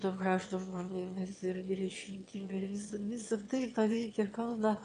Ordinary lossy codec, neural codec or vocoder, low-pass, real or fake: AAC, 48 kbps; autoencoder, 22.05 kHz, a latent of 192 numbers a frame, VITS, trained on one speaker; 9.9 kHz; fake